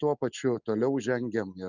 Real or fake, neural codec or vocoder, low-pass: real; none; 7.2 kHz